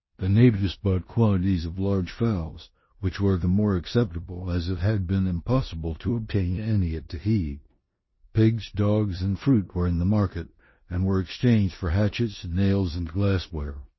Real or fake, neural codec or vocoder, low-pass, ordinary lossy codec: fake; codec, 16 kHz in and 24 kHz out, 0.9 kbps, LongCat-Audio-Codec, four codebook decoder; 7.2 kHz; MP3, 24 kbps